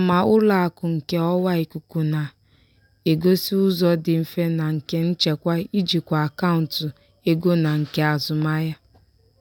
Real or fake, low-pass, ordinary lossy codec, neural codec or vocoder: real; 19.8 kHz; none; none